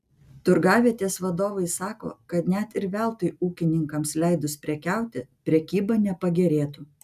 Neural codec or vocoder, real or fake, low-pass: none; real; 14.4 kHz